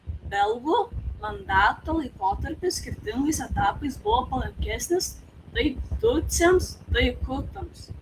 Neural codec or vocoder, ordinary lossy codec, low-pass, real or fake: none; Opus, 24 kbps; 14.4 kHz; real